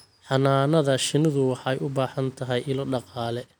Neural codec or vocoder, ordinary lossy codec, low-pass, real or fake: none; none; none; real